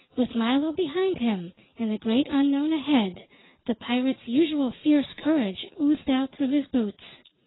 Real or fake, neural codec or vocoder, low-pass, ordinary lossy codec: real; none; 7.2 kHz; AAC, 16 kbps